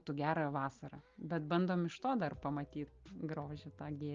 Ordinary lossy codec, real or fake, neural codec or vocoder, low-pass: Opus, 32 kbps; real; none; 7.2 kHz